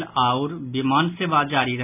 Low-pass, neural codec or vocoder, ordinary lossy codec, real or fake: 3.6 kHz; none; none; real